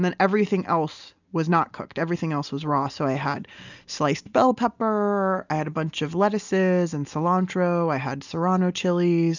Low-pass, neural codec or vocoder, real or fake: 7.2 kHz; none; real